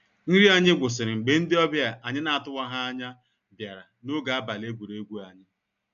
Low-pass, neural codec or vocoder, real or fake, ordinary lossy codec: 7.2 kHz; none; real; none